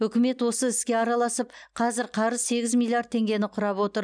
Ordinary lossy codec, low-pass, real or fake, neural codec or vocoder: none; 9.9 kHz; real; none